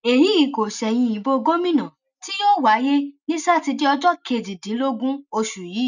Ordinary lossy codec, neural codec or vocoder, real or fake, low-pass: none; none; real; 7.2 kHz